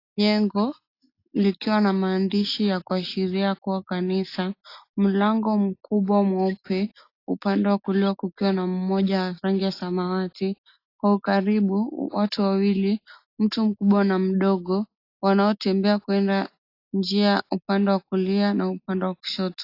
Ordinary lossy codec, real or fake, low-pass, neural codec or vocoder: AAC, 32 kbps; real; 5.4 kHz; none